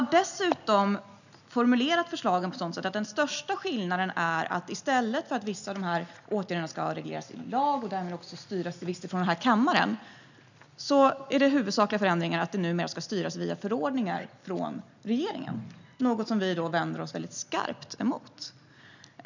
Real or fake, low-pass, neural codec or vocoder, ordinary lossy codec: real; 7.2 kHz; none; none